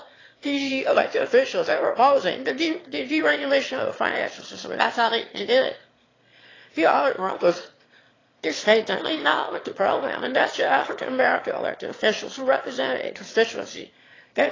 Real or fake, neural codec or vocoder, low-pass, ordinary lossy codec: fake; autoencoder, 22.05 kHz, a latent of 192 numbers a frame, VITS, trained on one speaker; 7.2 kHz; AAC, 32 kbps